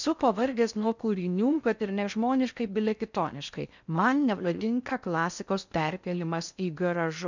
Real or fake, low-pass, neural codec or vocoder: fake; 7.2 kHz; codec, 16 kHz in and 24 kHz out, 0.6 kbps, FocalCodec, streaming, 4096 codes